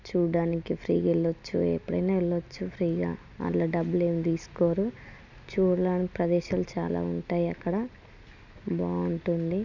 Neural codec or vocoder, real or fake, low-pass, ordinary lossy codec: none; real; 7.2 kHz; none